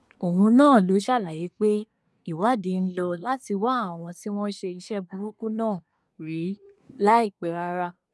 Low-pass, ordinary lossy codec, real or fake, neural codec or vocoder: none; none; fake; codec, 24 kHz, 1 kbps, SNAC